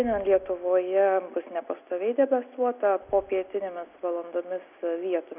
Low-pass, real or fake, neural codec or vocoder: 3.6 kHz; real; none